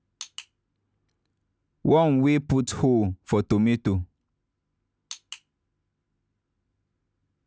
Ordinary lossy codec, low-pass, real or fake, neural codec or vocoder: none; none; real; none